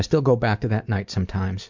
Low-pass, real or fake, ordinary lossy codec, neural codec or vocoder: 7.2 kHz; real; MP3, 64 kbps; none